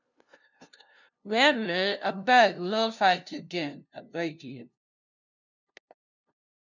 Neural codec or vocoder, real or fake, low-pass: codec, 16 kHz, 0.5 kbps, FunCodec, trained on LibriTTS, 25 frames a second; fake; 7.2 kHz